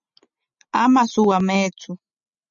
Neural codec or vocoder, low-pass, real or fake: none; 7.2 kHz; real